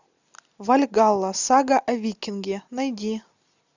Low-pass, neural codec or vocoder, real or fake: 7.2 kHz; none; real